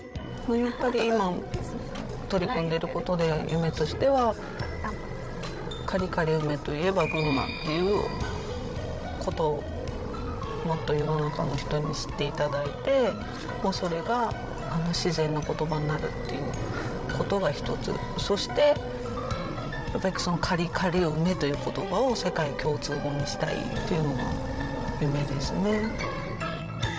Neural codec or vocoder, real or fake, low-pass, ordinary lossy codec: codec, 16 kHz, 16 kbps, FreqCodec, larger model; fake; none; none